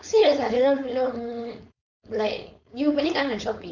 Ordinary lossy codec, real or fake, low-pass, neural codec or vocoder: none; fake; 7.2 kHz; codec, 16 kHz, 4.8 kbps, FACodec